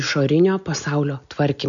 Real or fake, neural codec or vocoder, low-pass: real; none; 7.2 kHz